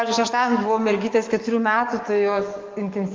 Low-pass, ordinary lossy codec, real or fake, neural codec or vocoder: 7.2 kHz; Opus, 32 kbps; fake; codec, 44.1 kHz, 7.8 kbps, DAC